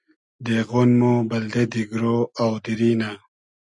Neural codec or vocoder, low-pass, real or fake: none; 10.8 kHz; real